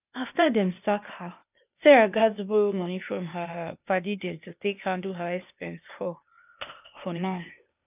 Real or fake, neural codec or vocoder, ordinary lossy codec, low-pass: fake; codec, 16 kHz, 0.8 kbps, ZipCodec; none; 3.6 kHz